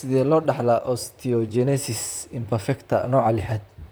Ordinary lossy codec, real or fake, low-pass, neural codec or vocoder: none; fake; none; vocoder, 44.1 kHz, 128 mel bands, Pupu-Vocoder